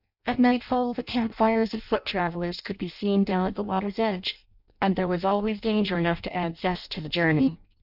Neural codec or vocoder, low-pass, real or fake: codec, 16 kHz in and 24 kHz out, 0.6 kbps, FireRedTTS-2 codec; 5.4 kHz; fake